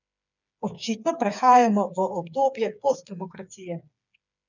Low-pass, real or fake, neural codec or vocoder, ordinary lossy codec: 7.2 kHz; fake; codec, 16 kHz, 4 kbps, FreqCodec, smaller model; none